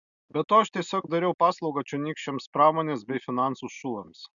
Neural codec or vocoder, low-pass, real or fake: none; 7.2 kHz; real